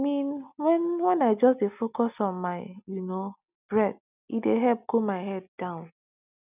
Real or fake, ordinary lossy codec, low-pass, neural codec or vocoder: real; none; 3.6 kHz; none